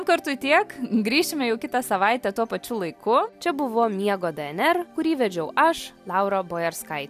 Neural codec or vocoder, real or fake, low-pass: none; real; 14.4 kHz